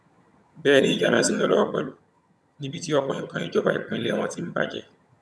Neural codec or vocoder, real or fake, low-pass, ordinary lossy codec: vocoder, 22.05 kHz, 80 mel bands, HiFi-GAN; fake; none; none